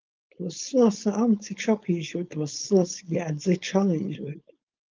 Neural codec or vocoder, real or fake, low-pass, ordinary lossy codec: codec, 16 kHz, 4.8 kbps, FACodec; fake; 7.2 kHz; Opus, 32 kbps